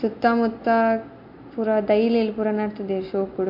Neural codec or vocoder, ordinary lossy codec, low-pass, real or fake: none; MP3, 32 kbps; 5.4 kHz; real